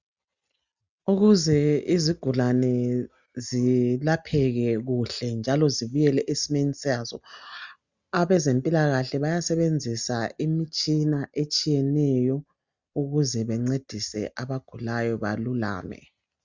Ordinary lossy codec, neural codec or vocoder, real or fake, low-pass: Opus, 64 kbps; none; real; 7.2 kHz